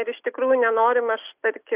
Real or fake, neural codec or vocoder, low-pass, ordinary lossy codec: real; none; 3.6 kHz; Opus, 64 kbps